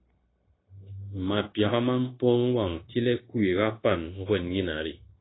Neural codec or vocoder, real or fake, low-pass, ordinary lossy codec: codec, 16 kHz, 0.9 kbps, LongCat-Audio-Codec; fake; 7.2 kHz; AAC, 16 kbps